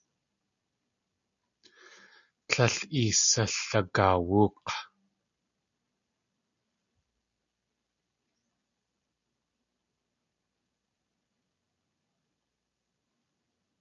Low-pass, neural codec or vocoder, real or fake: 7.2 kHz; none; real